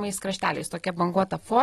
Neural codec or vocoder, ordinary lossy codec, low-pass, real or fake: none; AAC, 32 kbps; 19.8 kHz; real